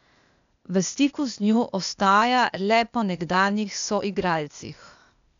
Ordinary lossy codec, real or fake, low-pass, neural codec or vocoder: none; fake; 7.2 kHz; codec, 16 kHz, 0.8 kbps, ZipCodec